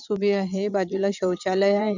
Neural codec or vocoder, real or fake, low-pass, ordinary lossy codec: vocoder, 22.05 kHz, 80 mel bands, Vocos; fake; 7.2 kHz; none